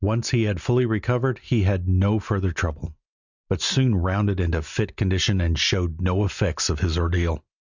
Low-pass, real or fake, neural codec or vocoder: 7.2 kHz; real; none